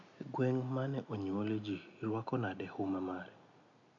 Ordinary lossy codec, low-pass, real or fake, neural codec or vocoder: AAC, 48 kbps; 7.2 kHz; real; none